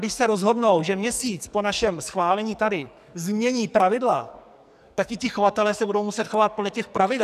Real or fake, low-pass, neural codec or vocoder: fake; 14.4 kHz; codec, 44.1 kHz, 2.6 kbps, SNAC